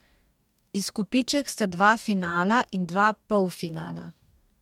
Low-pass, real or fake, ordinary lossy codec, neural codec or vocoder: 19.8 kHz; fake; MP3, 96 kbps; codec, 44.1 kHz, 2.6 kbps, DAC